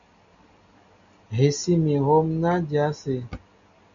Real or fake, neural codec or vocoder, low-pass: real; none; 7.2 kHz